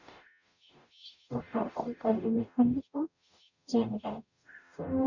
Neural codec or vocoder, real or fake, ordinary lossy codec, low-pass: codec, 44.1 kHz, 0.9 kbps, DAC; fake; none; 7.2 kHz